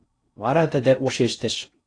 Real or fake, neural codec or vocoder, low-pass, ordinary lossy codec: fake; codec, 16 kHz in and 24 kHz out, 0.6 kbps, FocalCodec, streaming, 4096 codes; 9.9 kHz; AAC, 48 kbps